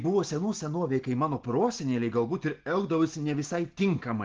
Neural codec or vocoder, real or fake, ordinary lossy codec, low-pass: none; real; Opus, 16 kbps; 7.2 kHz